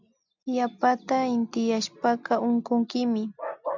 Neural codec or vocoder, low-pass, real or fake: none; 7.2 kHz; real